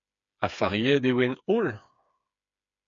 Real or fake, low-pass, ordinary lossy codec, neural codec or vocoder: fake; 7.2 kHz; MP3, 64 kbps; codec, 16 kHz, 4 kbps, FreqCodec, smaller model